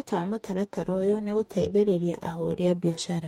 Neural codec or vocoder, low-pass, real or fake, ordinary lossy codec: codec, 44.1 kHz, 2.6 kbps, DAC; 19.8 kHz; fake; MP3, 64 kbps